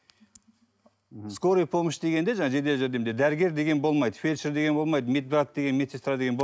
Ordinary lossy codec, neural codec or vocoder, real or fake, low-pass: none; none; real; none